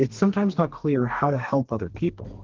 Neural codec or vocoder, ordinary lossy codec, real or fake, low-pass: codec, 32 kHz, 1.9 kbps, SNAC; Opus, 16 kbps; fake; 7.2 kHz